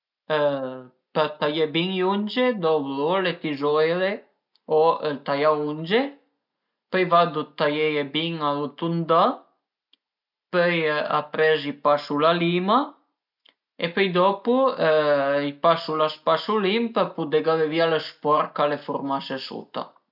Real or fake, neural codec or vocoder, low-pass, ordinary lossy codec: real; none; 5.4 kHz; none